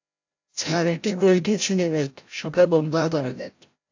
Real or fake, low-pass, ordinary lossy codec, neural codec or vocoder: fake; 7.2 kHz; AAC, 48 kbps; codec, 16 kHz, 0.5 kbps, FreqCodec, larger model